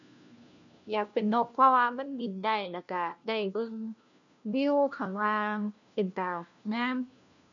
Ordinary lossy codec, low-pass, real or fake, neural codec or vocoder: none; 7.2 kHz; fake; codec, 16 kHz, 1 kbps, FunCodec, trained on LibriTTS, 50 frames a second